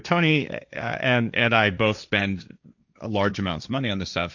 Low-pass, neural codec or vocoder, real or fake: 7.2 kHz; codec, 16 kHz, 1.1 kbps, Voila-Tokenizer; fake